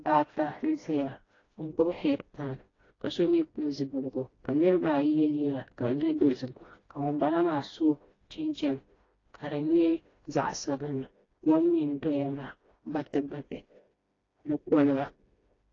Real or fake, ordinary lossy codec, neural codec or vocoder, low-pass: fake; AAC, 32 kbps; codec, 16 kHz, 1 kbps, FreqCodec, smaller model; 7.2 kHz